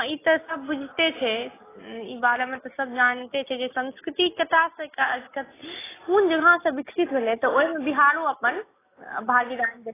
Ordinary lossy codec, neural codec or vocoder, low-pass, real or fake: AAC, 16 kbps; none; 3.6 kHz; real